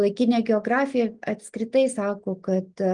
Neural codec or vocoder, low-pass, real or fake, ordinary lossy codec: none; 9.9 kHz; real; Opus, 24 kbps